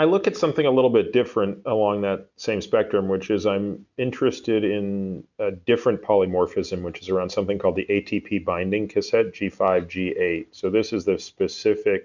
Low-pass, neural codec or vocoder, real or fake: 7.2 kHz; none; real